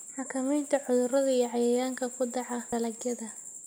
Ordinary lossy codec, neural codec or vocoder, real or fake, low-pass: none; none; real; none